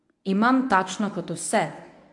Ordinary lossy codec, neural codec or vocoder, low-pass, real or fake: AAC, 64 kbps; codec, 24 kHz, 0.9 kbps, WavTokenizer, medium speech release version 1; 10.8 kHz; fake